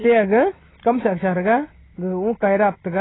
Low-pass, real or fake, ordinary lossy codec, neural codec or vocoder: 7.2 kHz; real; AAC, 16 kbps; none